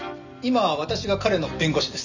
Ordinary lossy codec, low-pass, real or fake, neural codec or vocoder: none; 7.2 kHz; real; none